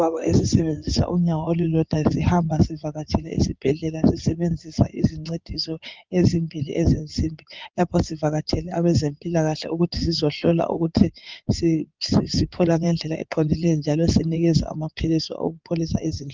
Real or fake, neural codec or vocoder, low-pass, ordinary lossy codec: fake; codec, 16 kHz in and 24 kHz out, 2.2 kbps, FireRedTTS-2 codec; 7.2 kHz; Opus, 32 kbps